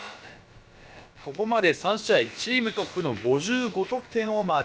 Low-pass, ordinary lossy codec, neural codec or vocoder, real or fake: none; none; codec, 16 kHz, about 1 kbps, DyCAST, with the encoder's durations; fake